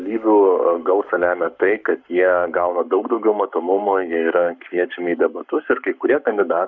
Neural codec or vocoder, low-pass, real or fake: codec, 44.1 kHz, 7.8 kbps, Pupu-Codec; 7.2 kHz; fake